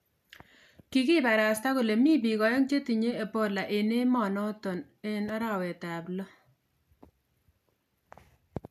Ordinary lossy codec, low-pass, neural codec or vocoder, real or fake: none; 14.4 kHz; none; real